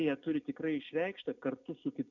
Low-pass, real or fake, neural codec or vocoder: 7.2 kHz; real; none